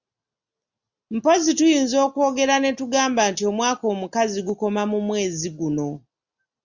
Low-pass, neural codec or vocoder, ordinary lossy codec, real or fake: 7.2 kHz; none; Opus, 64 kbps; real